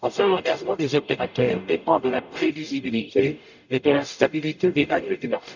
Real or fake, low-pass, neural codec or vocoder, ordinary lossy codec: fake; 7.2 kHz; codec, 44.1 kHz, 0.9 kbps, DAC; none